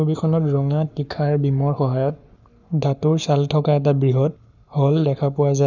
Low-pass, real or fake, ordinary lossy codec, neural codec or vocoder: 7.2 kHz; fake; none; codec, 44.1 kHz, 7.8 kbps, Pupu-Codec